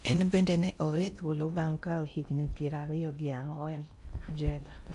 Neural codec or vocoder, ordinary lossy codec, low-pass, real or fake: codec, 16 kHz in and 24 kHz out, 0.6 kbps, FocalCodec, streaming, 4096 codes; none; 10.8 kHz; fake